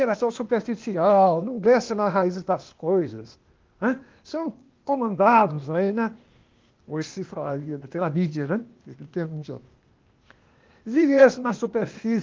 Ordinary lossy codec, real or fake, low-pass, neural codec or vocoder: Opus, 32 kbps; fake; 7.2 kHz; codec, 16 kHz, 0.8 kbps, ZipCodec